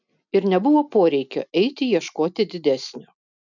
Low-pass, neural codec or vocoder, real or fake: 7.2 kHz; none; real